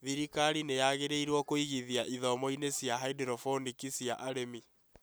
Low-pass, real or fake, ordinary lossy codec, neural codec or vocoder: none; real; none; none